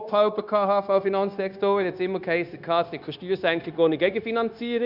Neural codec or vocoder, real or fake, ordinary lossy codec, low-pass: codec, 16 kHz, 0.9 kbps, LongCat-Audio-Codec; fake; none; 5.4 kHz